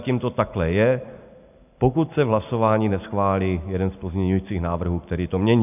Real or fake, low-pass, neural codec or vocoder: real; 3.6 kHz; none